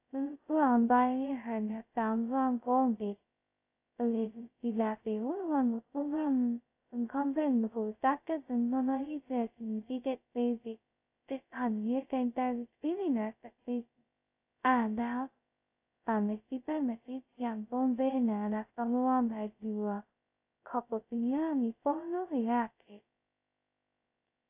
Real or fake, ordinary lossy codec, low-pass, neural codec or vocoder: fake; AAC, 32 kbps; 3.6 kHz; codec, 16 kHz, 0.2 kbps, FocalCodec